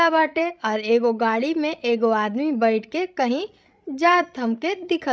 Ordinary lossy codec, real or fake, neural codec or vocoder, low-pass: none; fake; codec, 16 kHz, 16 kbps, FreqCodec, larger model; none